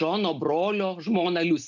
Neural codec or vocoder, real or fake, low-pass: none; real; 7.2 kHz